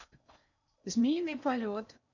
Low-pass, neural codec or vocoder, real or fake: 7.2 kHz; codec, 16 kHz in and 24 kHz out, 0.8 kbps, FocalCodec, streaming, 65536 codes; fake